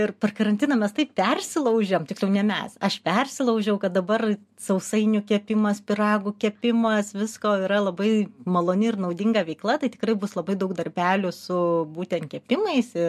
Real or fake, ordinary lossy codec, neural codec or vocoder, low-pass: real; MP3, 64 kbps; none; 14.4 kHz